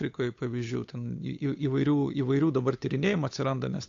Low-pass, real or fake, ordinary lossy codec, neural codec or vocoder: 7.2 kHz; fake; AAC, 48 kbps; codec, 16 kHz, 8 kbps, FunCodec, trained on Chinese and English, 25 frames a second